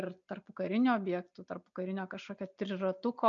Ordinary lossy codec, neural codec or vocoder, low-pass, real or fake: MP3, 96 kbps; none; 7.2 kHz; real